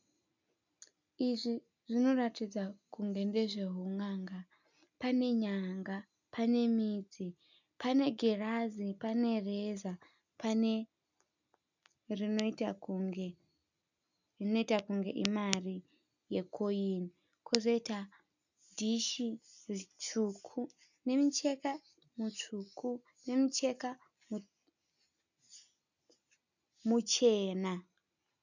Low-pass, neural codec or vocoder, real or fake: 7.2 kHz; none; real